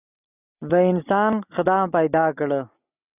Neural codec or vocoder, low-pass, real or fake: none; 3.6 kHz; real